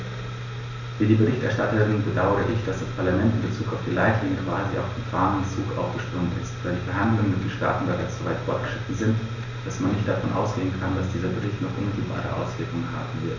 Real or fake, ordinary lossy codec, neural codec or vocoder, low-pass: real; none; none; 7.2 kHz